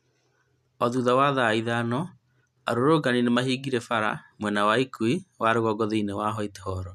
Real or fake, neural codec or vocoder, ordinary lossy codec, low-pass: real; none; none; 14.4 kHz